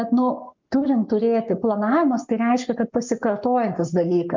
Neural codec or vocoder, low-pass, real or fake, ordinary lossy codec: codec, 44.1 kHz, 7.8 kbps, Pupu-Codec; 7.2 kHz; fake; MP3, 64 kbps